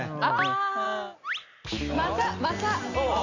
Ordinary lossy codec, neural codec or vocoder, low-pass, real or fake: none; none; 7.2 kHz; real